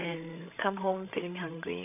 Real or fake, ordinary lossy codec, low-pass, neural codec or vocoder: fake; none; 3.6 kHz; codec, 16 kHz, 16 kbps, FreqCodec, larger model